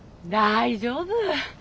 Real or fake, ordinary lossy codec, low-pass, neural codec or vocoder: real; none; none; none